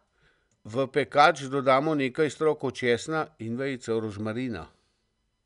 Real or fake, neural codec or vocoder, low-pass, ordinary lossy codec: real; none; 10.8 kHz; none